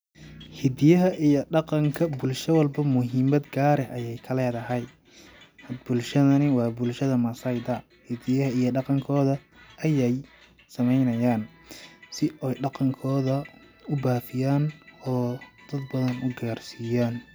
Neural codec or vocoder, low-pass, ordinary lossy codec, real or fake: none; none; none; real